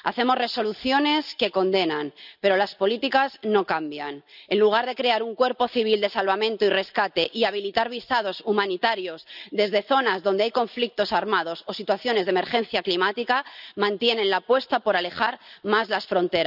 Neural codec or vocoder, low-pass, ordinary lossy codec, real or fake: none; 5.4 kHz; none; real